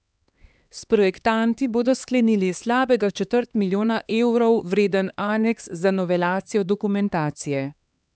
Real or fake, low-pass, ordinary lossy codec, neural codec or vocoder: fake; none; none; codec, 16 kHz, 2 kbps, X-Codec, HuBERT features, trained on LibriSpeech